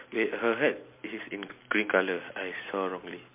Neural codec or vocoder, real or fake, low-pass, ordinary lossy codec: none; real; 3.6 kHz; MP3, 24 kbps